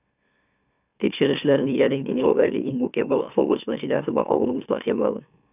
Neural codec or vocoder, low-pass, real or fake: autoencoder, 44.1 kHz, a latent of 192 numbers a frame, MeloTTS; 3.6 kHz; fake